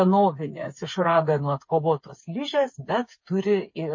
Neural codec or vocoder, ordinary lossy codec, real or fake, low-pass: codec, 16 kHz, 8 kbps, FreqCodec, smaller model; MP3, 32 kbps; fake; 7.2 kHz